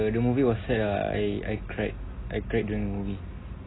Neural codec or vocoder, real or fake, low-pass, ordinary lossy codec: none; real; 7.2 kHz; AAC, 16 kbps